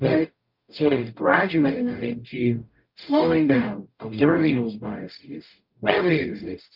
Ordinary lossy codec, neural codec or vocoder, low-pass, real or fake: Opus, 24 kbps; codec, 44.1 kHz, 0.9 kbps, DAC; 5.4 kHz; fake